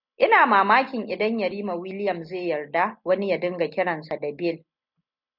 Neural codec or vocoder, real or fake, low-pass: none; real; 5.4 kHz